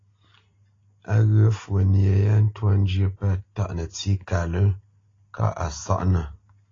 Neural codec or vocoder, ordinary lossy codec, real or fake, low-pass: none; AAC, 32 kbps; real; 7.2 kHz